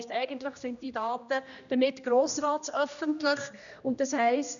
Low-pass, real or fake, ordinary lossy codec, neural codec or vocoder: 7.2 kHz; fake; none; codec, 16 kHz, 1 kbps, X-Codec, HuBERT features, trained on general audio